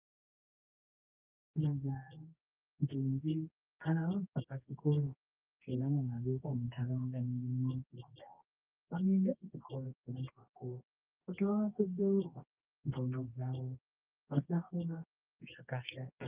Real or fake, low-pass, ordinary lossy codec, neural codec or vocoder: fake; 3.6 kHz; Opus, 24 kbps; codec, 24 kHz, 0.9 kbps, WavTokenizer, medium music audio release